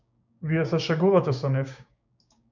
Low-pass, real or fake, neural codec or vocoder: 7.2 kHz; fake; codec, 16 kHz, 6 kbps, DAC